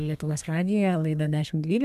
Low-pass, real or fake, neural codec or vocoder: 14.4 kHz; fake; codec, 32 kHz, 1.9 kbps, SNAC